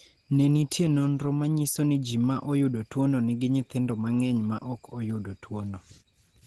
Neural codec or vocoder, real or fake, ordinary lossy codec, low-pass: none; real; Opus, 16 kbps; 10.8 kHz